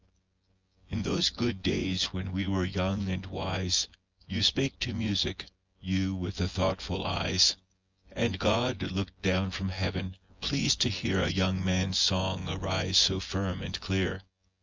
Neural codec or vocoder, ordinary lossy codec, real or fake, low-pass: vocoder, 24 kHz, 100 mel bands, Vocos; Opus, 32 kbps; fake; 7.2 kHz